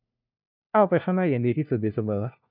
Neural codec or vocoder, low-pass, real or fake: codec, 16 kHz, 1 kbps, FunCodec, trained on LibriTTS, 50 frames a second; 5.4 kHz; fake